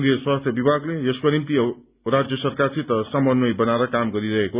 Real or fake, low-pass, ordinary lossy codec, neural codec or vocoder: real; 3.6 kHz; Opus, 64 kbps; none